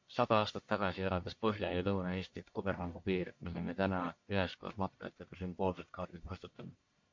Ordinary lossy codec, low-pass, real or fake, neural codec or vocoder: MP3, 48 kbps; 7.2 kHz; fake; codec, 44.1 kHz, 1.7 kbps, Pupu-Codec